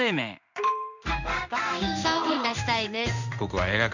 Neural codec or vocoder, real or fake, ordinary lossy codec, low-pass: codec, 16 kHz in and 24 kHz out, 1 kbps, XY-Tokenizer; fake; none; 7.2 kHz